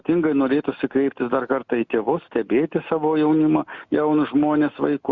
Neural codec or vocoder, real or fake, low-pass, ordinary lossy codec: none; real; 7.2 kHz; Opus, 64 kbps